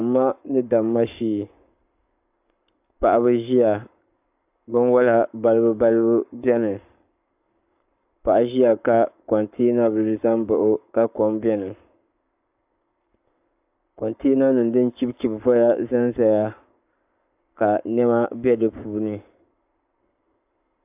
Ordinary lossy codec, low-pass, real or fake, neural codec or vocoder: AAC, 32 kbps; 3.6 kHz; fake; codec, 44.1 kHz, 7.8 kbps, Pupu-Codec